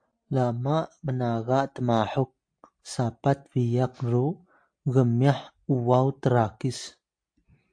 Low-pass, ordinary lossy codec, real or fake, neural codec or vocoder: 9.9 kHz; AAC, 64 kbps; real; none